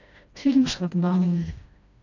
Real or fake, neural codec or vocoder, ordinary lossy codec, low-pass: fake; codec, 16 kHz, 1 kbps, FreqCodec, smaller model; none; 7.2 kHz